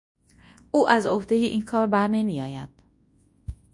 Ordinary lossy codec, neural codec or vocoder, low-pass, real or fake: MP3, 48 kbps; codec, 24 kHz, 0.9 kbps, WavTokenizer, large speech release; 10.8 kHz; fake